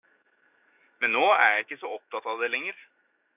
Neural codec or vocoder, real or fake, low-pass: autoencoder, 48 kHz, 128 numbers a frame, DAC-VAE, trained on Japanese speech; fake; 3.6 kHz